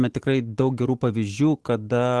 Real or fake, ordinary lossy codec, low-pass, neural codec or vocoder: fake; Opus, 16 kbps; 10.8 kHz; autoencoder, 48 kHz, 128 numbers a frame, DAC-VAE, trained on Japanese speech